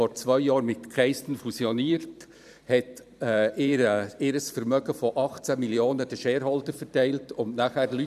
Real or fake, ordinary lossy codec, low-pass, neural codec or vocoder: fake; none; 14.4 kHz; vocoder, 44.1 kHz, 128 mel bands every 512 samples, BigVGAN v2